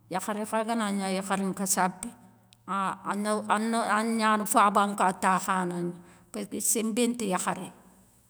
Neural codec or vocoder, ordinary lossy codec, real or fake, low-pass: autoencoder, 48 kHz, 128 numbers a frame, DAC-VAE, trained on Japanese speech; none; fake; none